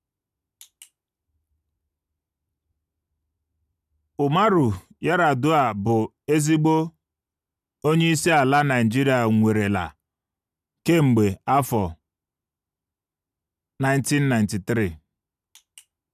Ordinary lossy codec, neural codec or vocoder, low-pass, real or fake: none; none; 14.4 kHz; real